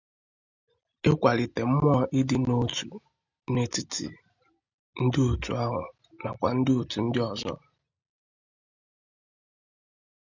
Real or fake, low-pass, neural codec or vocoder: real; 7.2 kHz; none